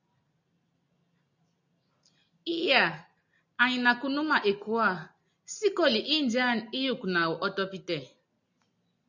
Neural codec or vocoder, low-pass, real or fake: none; 7.2 kHz; real